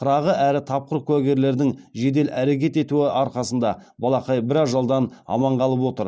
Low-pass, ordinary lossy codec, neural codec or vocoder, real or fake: none; none; none; real